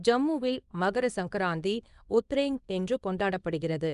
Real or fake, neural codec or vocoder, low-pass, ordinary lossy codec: fake; codec, 24 kHz, 0.9 kbps, WavTokenizer, small release; 10.8 kHz; none